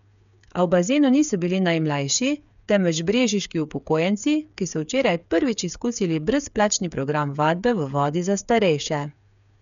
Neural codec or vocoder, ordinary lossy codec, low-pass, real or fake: codec, 16 kHz, 8 kbps, FreqCodec, smaller model; none; 7.2 kHz; fake